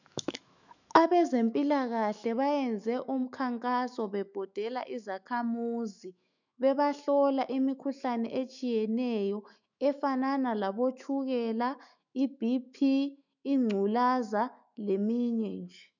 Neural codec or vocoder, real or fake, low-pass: autoencoder, 48 kHz, 128 numbers a frame, DAC-VAE, trained on Japanese speech; fake; 7.2 kHz